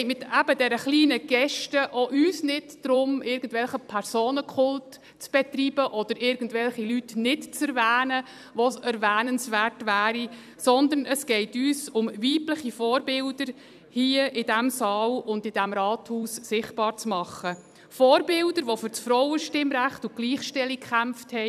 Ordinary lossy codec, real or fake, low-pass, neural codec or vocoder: none; fake; 14.4 kHz; vocoder, 44.1 kHz, 128 mel bands every 256 samples, BigVGAN v2